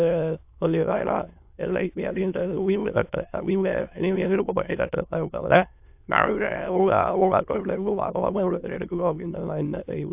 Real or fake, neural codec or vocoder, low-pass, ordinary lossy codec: fake; autoencoder, 22.05 kHz, a latent of 192 numbers a frame, VITS, trained on many speakers; 3.6 kHz; AAC, 32 kbps